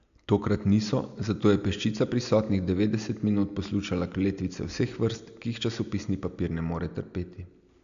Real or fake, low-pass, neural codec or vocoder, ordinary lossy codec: real; 7.2 kHz; none; AAC, 96 kbps